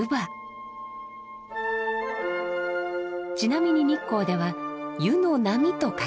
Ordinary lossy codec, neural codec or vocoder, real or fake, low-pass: none; none; real; none